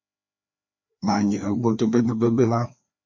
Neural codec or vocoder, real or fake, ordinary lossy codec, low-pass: codec, 16 kHz, 2 kbps, FreqCodec, larger model; fake; MP3, 48 kbps; 7.2 kHz